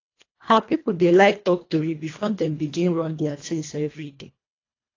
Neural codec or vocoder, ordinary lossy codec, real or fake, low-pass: codec, 24 kHz, 1.5 kbps, HILCodec; AAC, 32 kbps; fake; 7.2 kHz